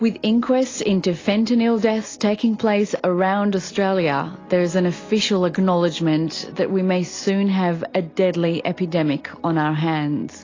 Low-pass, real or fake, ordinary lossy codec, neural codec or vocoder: 7.2 kHz; real; AAC, 32 kbps; none